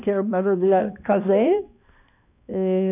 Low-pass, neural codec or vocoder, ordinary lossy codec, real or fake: 3.6 kHz; codec, 16 kHz, 1 kbps, X-Codec, HuBERT features, trained on balanced general audio; AAC, 32 kbps; fake